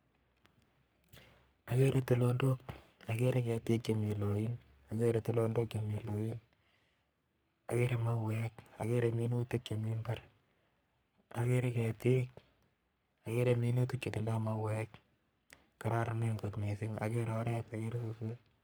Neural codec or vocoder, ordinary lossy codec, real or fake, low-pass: codec, 44.1 kHz, 3.4 kbps, Pupu-Codec; none; fake; none